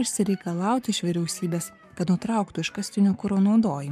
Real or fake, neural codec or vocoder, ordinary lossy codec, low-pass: fake; codec, 44.1 kHz, 7.8 kbps, Pupu-Codec; MP3, 96 kbps; 14.4 kHz